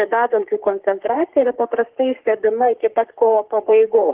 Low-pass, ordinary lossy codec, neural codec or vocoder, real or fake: 3.6 kHz; Opus, 16 kbps; codec, 44.1 kHz, 3.4 kbps, Pupu-Codec; fake